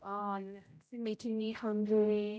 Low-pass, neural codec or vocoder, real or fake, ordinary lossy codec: none; codec, 16 kHz, 0.5 kbps, X-Codec, HuBERT features, trained on general audio; fake; none